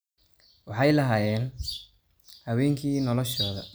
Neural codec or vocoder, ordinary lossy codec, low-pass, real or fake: none; none; none; real